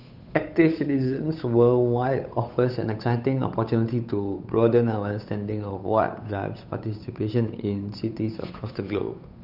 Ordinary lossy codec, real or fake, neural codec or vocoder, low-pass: none; fake; codec, 16 kHz, 8 kbps, FunCodec, trained on Chinese and English, 25 frames a second; 5.4 kHz